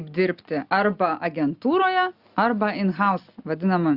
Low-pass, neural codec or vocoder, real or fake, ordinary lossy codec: 5.4 kHz; none; real; Opus, 64 kbps